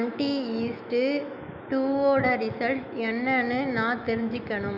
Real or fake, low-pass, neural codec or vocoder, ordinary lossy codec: fake; 5.4 kHz; autoencoder, 48 kHz, 128 numbers a frame, DAC-VAE, trained on Japanese speech; none